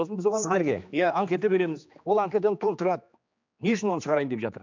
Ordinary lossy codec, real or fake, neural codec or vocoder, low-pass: MP3, 64 kbps; fake; codec, 16 kHz, 2 kbps, X-Codec, HuBERT features, trained on general audio; 7.2 kHz